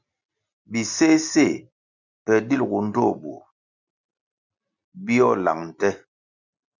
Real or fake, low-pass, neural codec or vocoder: real; 7.2 kHz; none